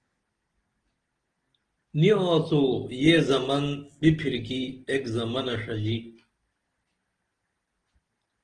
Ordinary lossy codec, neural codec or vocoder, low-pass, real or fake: Opus, 16 kbps; none; 9.9 kHz; real